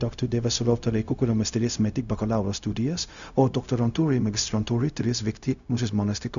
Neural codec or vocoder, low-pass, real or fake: codec, 16 kHz, 0.4 kbps, LongCat-Audio-Codec; 7.2 kHz; fake